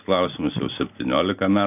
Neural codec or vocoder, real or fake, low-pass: none; real; 3.6 kHz